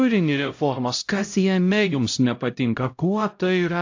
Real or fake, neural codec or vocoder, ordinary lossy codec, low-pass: fake; codec, 16 kHz, 0.5 kbps, X-Codec, HuBERT features, trained on LibriSpeech; AAC, 48 kbps; 7.2 kHz